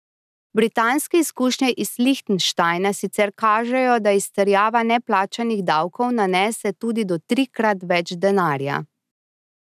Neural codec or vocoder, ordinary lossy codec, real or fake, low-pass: none; none; real; 14.4 kHz